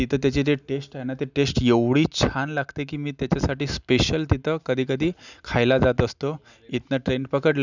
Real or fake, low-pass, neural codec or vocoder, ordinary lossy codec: real; 7.2 kHz; none; none